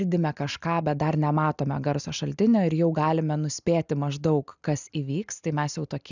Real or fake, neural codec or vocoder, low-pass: real; none; 7.2 kHz